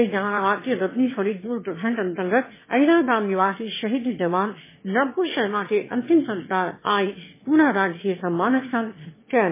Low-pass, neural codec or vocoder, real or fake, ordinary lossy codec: 3.6 kHz; autoencoder, 22.05 kHz, a latent of 192 numbers a frame, VITS, trained on one speaker; fake; MP3, 16 kbps